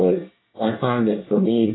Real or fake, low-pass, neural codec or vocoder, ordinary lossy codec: fake; 7.2 kHz; codec, 24 kHz, 1 kbps, SNAC; AAC, 16 kbps